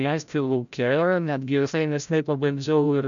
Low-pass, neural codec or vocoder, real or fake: 7.2 kHz; codec, 16 kHz, 0.5 kbps, FreqCodec, larger model; fake